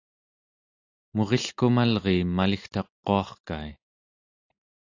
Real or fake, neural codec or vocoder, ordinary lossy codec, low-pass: real; none; AAC, 48 kbps; 7.2 kHz